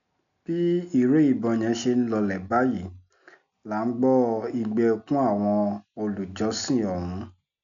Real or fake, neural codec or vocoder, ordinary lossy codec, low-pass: real; none; none; 7.2 kHz